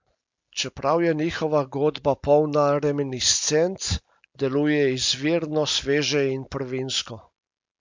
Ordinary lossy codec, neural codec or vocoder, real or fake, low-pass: MP3, 48 kbps; none; real; 7.2 kHz